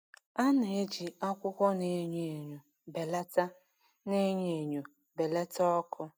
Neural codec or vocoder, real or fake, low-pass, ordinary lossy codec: none; real; none; none